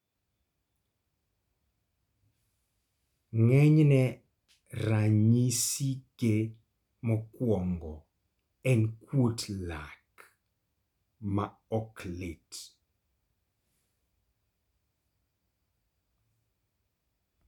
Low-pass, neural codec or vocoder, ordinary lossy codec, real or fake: 19.8 kHz; none; none; real